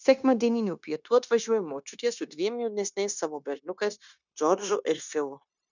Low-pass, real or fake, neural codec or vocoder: 7.2 kHz; fake; codec, 16 kHz, 0.9 kbps, LongCat-Audio-Codec